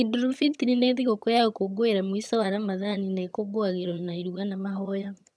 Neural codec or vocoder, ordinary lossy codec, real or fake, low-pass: vocoder, 22.05 kHz, 80 mel bands, HiFi-GAN; none; fake; none